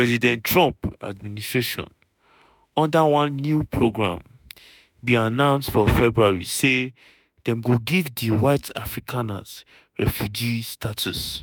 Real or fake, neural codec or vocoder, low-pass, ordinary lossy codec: fake; autoencoder, 48 kHz, 32 numbers a frame, DAC-VAE, trained on Japanese speech; none; none